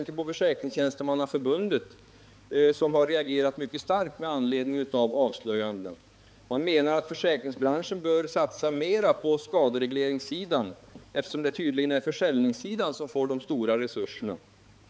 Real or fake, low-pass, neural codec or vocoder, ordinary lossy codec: fake; none; codec, 16 kHz, 4 kbps, X-Codec, HuBERT features, trained on balanced general audio; none